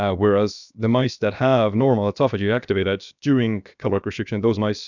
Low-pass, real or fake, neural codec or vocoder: 7.2 kHz; fake; codec, 16 kHz, about 1 kbps, DyCAST, with the encoder's durations